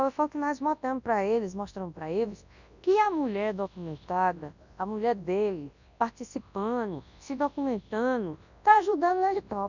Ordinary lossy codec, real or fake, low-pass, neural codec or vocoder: none; fake; 7.2 kHz; codec, 24 kHz, 0.9 kbps, WavTokenizer, large speech release